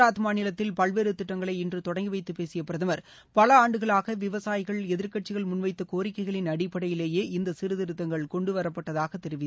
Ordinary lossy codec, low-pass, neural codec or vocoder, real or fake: none; none; none; real